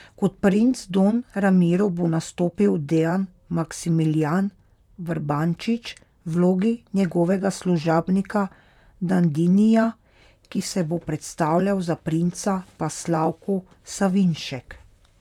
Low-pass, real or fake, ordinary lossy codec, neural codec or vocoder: 19.8 kHz; fake; none; vocoder, 44.1 kHz, 128 mel bands, Pupu-Vocoder